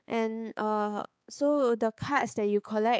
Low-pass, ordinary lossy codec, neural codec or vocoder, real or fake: none; none; codec, 16 kHz, 4 kbps, X-Codec, HuBERT features, trained on balanced general audio; fake